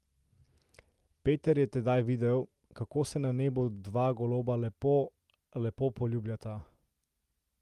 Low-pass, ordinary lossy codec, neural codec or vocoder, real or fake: 14.4 kHz; Opus, 32 kbps; none; real